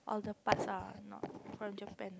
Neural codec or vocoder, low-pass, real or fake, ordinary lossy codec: none; none; real; none